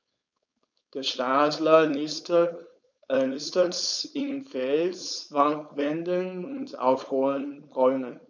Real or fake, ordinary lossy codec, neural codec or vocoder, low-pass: fake; none; codec, 16 kHz, 4.8 kbps, FACodec; 7.2 kHz